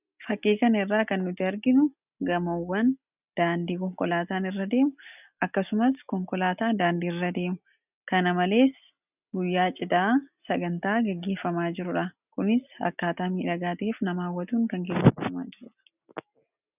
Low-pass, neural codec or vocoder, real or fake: 3.6 kHz; none; real